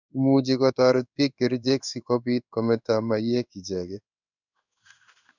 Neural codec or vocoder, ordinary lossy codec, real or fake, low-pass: codec, 16 kHz in and 24 kHz out, 1 kbps, XY-Tokenizer; none; fake; 7.2 kHz